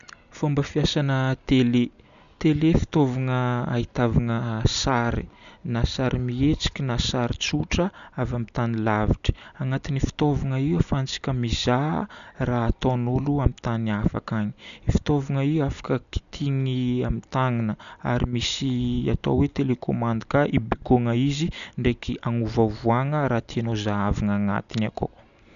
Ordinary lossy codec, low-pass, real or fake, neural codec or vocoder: none; 7.2 kHz; real; none